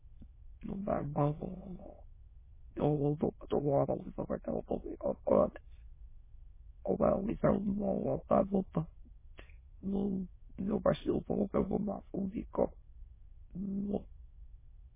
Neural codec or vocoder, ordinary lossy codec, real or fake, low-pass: autoencoder, 22.05 kHz, a latent of 192 numbers a frame, VITS, trained on many speakers; MP3, 16 kbps; fake; 3.6 kHz